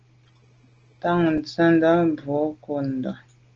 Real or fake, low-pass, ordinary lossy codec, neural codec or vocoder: real; 7.2 kHz; Opus, 16 kbps; none